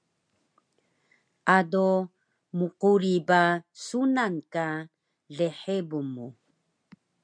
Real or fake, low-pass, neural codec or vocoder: real; 9.9 kHz; none